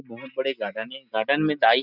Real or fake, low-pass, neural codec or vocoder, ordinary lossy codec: real; 5.4 kHz; none; none